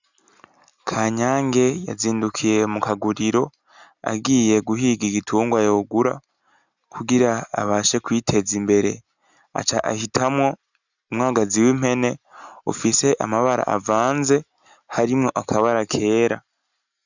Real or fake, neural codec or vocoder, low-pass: real; none; 7.2 kHz